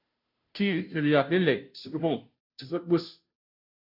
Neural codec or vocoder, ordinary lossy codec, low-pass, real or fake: codec, 16 kHz, 0.5 kbps, FunCodec, trained on Chinese and English, 25 frames a second; AAC, 48 kbps; 5.4 kHz; fake